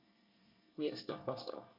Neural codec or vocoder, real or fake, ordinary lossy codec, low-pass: codec, 24 kHz, 1 kbps, SNAC; fake; none; 5.4 kHz